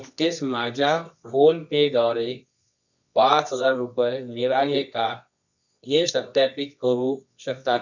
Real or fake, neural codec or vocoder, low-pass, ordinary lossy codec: fake; codec, 24 kHz, 0.9 kbps, WavTokenizer, medium music audio release; 7.2 kHz; none